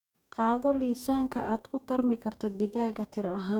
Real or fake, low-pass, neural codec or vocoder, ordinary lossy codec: fake; 19.8 kHz; codec, 44.1 kHz, 2.6 kbps, DAC; none